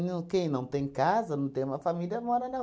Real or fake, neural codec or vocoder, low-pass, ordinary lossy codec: real; none; none; none